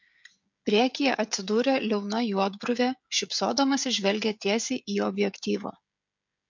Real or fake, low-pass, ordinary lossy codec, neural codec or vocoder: fake; 7.2 kHz; MP3, 64 kbps; codec, 16 kHz, 16 kbps, FreqCodec, smaller model